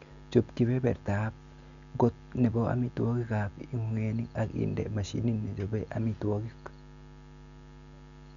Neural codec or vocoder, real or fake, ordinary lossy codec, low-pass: none; real; none; 7.2 kHz